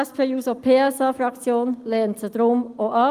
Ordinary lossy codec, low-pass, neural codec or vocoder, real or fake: Opus, 32 kbps; 14.4 kHz; none; real